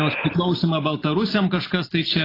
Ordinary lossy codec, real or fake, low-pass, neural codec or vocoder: AAC, 24 kbps; real; 5.4 kHz; none